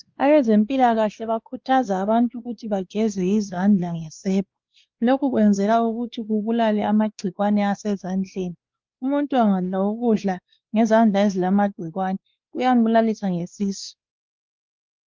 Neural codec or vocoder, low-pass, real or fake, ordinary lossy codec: codec, 16 kHz, 2 kbps, X-Codec, WavLM features, trained on Multilingual LibriSpeech; 7.2 kHz; fake; Opus, 32 kbps